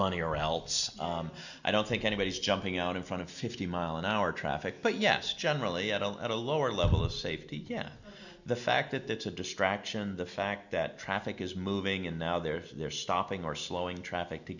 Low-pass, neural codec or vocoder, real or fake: 7.2 kHz; none; real